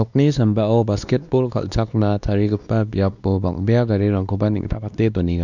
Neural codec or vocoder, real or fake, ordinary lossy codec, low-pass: codec, 16 kHz, 2 kbps, X-Codec, WavLM features, trained on Multilingual LibriSpeech; fake; none; 7.2 kHz